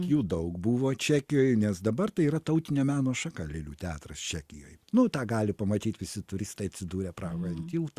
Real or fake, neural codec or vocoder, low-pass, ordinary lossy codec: real; none; 14.4 kHz; Opus, 64 kbps